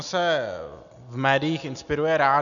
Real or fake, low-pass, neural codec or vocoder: real; 7.2 kHz; none